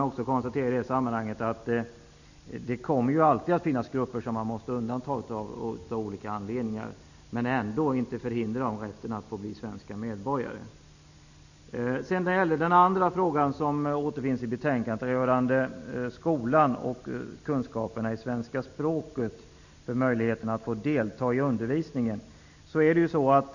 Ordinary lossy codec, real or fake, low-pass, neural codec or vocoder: none; real; 7.2 kHz; none